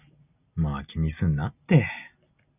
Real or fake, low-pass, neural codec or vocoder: real; 3.6 kHz; none